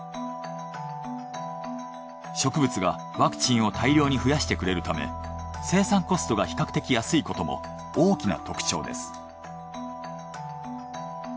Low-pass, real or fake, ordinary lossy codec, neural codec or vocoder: none; real; none; none